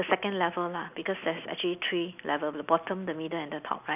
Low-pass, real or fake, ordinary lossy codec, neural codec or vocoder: 3.6 kHz; real; none; none